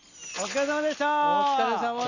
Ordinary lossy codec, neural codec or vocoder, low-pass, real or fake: none; none; 7.2 kHz; real